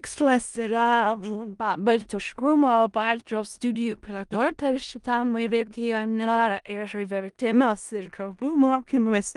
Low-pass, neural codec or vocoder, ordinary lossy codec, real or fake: 10.8 kHz; codec, 16 kHz in and 24 kHz out, 0.4 kbps, LongCat-Audio-Codec, four codebook decoder; Opus, 32 kbps; fake